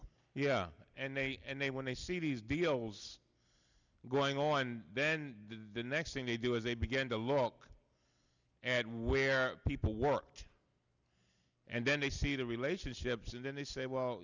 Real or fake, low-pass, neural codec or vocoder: real; 7.2 kHz; none